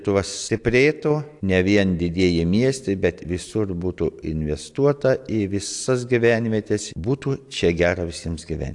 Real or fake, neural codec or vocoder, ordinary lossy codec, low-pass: real; none; AAC, 64 kbps; 10.8 kHz